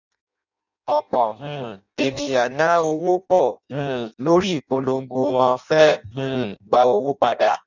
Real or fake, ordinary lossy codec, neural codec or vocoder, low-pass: fake; none; codec, 16 kHz in and 24 kHz out, 0.6 kbps, FireRedTTS-2 codec; 7.2 kHz